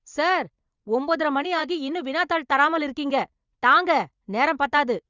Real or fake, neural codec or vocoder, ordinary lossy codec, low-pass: fake; vocoder, 44.1 kHz, 80 mel bands, Vocos; Opus, 64 kbps; 7.2 kHz